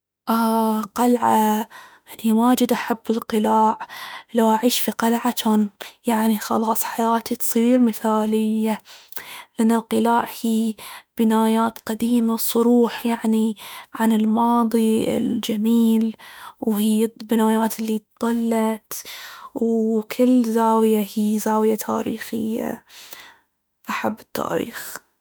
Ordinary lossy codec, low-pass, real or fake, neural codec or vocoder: none; none; fake; autoencoder, 48 kHz, 32 numbers a frame, DAC-VAE, trained on Japanese speech